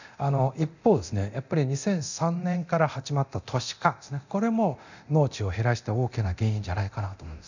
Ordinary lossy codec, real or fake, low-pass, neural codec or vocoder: none; fake; 7.2 kHz; codec, 24 kHz, 0.9 kbps, DualCodec